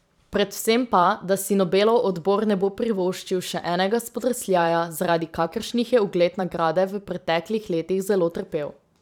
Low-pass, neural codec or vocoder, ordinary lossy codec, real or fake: 19.8 kHz; vocoder, 44.1 kHz, 128 mel bands every 512 samples, BigVGAN v2; none; fake